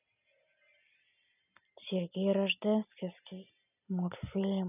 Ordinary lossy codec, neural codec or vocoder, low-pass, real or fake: none; none; 3.6 kHz; real